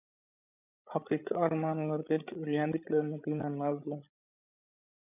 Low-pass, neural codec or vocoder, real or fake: 3.6 kHz; codec, 16 kHz, 16 kbps, FreqCodec, larger model; fake